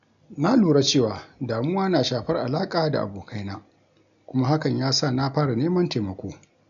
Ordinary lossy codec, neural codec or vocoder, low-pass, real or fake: none; none; 7.2 kHz; real